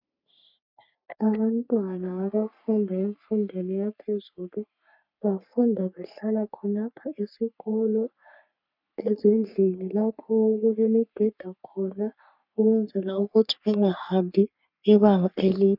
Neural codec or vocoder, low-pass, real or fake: codec, 44.1 kHz, 3.4 kbps, Pupu-Codec; 5.4 kHz; fake